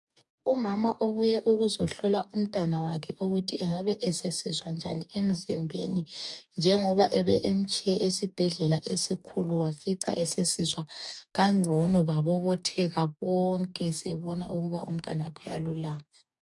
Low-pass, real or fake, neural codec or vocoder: 10.8 kHz; fake; codec, 44.1 kHz, 2.6 kbps, DAC